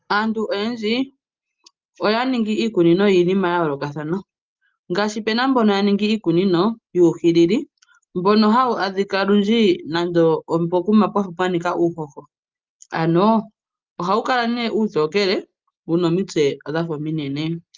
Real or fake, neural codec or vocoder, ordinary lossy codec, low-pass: real; none; Opus, 24 kbps; 7.2 kHz